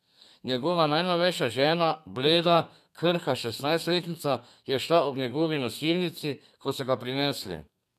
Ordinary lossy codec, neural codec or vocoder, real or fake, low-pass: none; codec, 32 kHz, 1.9 kbps, SNAC; fake; 14.4 kHz